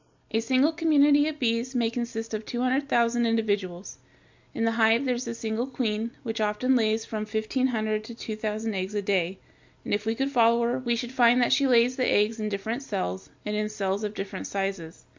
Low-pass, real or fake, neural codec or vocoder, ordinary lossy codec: 7.2 kHz; real; none; MP3, 64 kbps